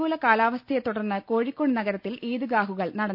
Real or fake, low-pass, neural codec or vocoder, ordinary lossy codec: real; 5.4 kHz; none; none